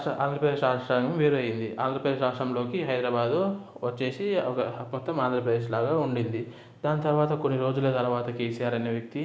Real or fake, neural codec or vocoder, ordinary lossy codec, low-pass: real; none; none; none